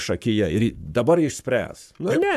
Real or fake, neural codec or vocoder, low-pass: fake; codec, 44.1 kHz, 7.8 kbps, Pupu-Codec; 14.4 kHz